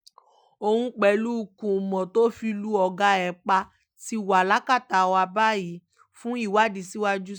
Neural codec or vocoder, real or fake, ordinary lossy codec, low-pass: none; real; none; none